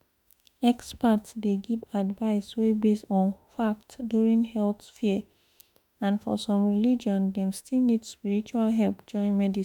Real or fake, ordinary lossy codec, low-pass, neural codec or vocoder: fake; none; 19.8 kHz; autoencoder, 48 kHz, 32 numbers a frame, DAC-VAE, trained on Japanese speech